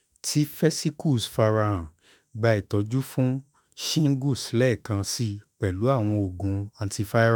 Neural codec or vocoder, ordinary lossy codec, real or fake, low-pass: autoencoder, 48 kHz, 32 numbers a frame, DAC-VAE, trained on Japanese speech; none; fake; none